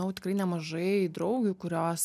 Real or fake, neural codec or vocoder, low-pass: real; none; 14.4 kHz